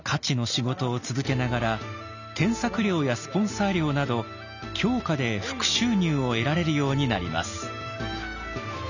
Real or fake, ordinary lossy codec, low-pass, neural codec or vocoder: real; none; 7.2 kHz; none